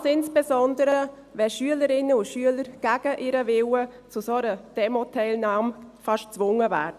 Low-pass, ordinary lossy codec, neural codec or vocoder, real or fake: 14.4 kHz; none; none; real